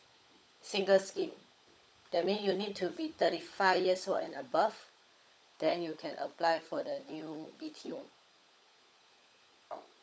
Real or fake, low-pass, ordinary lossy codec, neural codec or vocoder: fake; none; none; codec, 16 kHz, 16 kbps, FunCodec, trained on LibriTTS, 50 frames a second